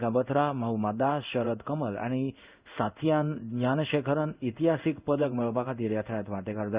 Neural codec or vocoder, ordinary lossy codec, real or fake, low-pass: codec, 16 kHz in and 24 kHz out, 1 kbps, XY-Tokenizer; Opus, 64 kbps; fake; 3.6 kHz